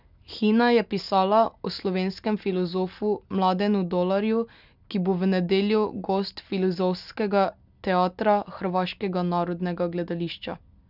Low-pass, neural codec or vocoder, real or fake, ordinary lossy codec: 5.4 kHz; none; real; none